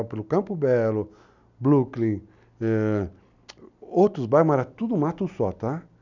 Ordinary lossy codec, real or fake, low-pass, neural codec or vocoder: none; real; 7.2 kHz; none